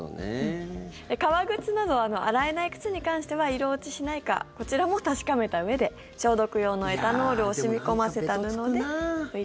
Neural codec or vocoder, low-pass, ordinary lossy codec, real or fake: none; none; none; real